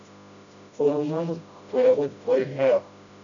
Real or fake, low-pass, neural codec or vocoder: fake; 7.2 kHz; codec, 16 kHz, 0.5 kbps, FreqCodec, smaller model